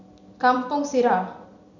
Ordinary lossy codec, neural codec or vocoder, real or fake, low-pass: none; vocoder, 44.1 kHz, 128 mel bands every 256 samples, BigVGAN v2; fake; 7.2 kHz